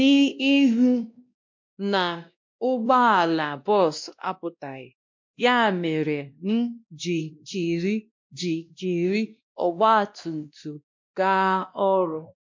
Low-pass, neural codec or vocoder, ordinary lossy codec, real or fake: 7.2 kHz; codec, 16 kHz, 1 kbps, X-Codec, WavLM features, trained on Multilingual LibriSpeech; MP3, 48 kbps; fake